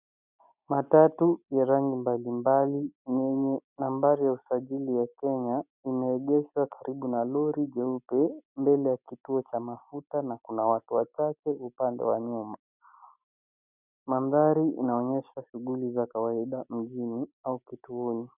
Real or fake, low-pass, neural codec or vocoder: real; 3.6 kHz; none